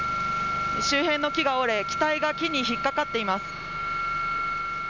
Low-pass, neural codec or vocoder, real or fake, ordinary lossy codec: 7.2 kHz; none; real; none